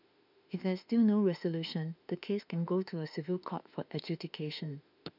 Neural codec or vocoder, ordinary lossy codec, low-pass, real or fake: autoencoder, 48 kHz, 32 numbers a frame, DAC-VAE, trained on Japanese speech; none; 5.4 kHz; fake